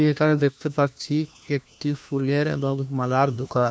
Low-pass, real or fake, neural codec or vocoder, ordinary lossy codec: none; fake; codec, 16 kHz, 1 kbps, FunCodec, trained on LibriTTS, 50 frames a second; none